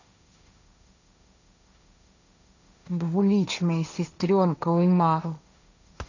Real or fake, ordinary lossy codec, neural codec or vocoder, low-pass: fake; none; codec, 16 kHz, 1.1 kbps, Voila-Tokenizer; 7.2 kHz